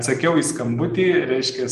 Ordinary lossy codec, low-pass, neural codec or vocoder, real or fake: Opus, 32 kbps; 14.4 kHz; none; real